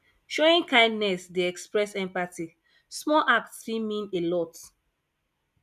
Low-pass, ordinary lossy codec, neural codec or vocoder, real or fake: 14.4 kHz; none; none; real